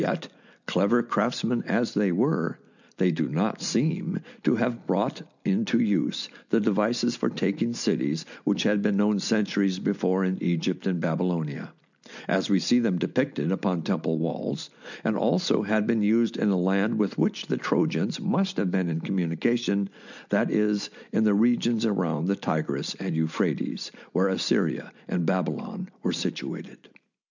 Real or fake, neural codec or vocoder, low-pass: real; none; 7.2 kHz